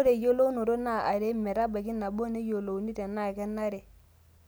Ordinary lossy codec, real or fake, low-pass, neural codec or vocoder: none; real; none; none